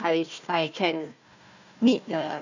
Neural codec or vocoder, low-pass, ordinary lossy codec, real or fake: codec, 16 kHz, 1 kbps, FunCodec, trained on Chinese and English, 50 frames a second; 7.2 kHz; none; fake